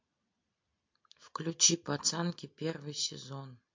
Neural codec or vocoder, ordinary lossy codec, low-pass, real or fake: none; MP3, 48 kbps; 7.2 kHz; real